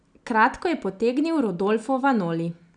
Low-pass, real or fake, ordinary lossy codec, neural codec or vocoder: 9.9 kHz; real; none; none